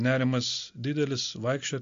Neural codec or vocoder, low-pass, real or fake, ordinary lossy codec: none; 7.2 kHz; real; MP3, 48 kbps